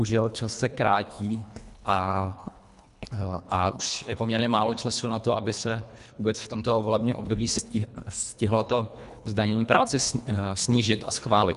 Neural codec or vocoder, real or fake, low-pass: codec, 24 kHz, 1.5 kbps, HILCodec; fake; 10.8 kHz